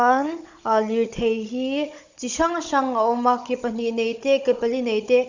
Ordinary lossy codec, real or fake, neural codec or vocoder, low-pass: Opus, 64 kbps; fake; codec, 16 kHz, 16 kbps, FunCodec, trained on Chinese and English, 50 frames a second; 7.2 kHz